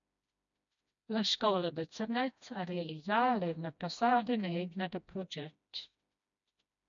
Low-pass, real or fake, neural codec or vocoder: 7.2 kHz; fake; codec, 16 kHz, 1 kbps, FreqCodec, smaller model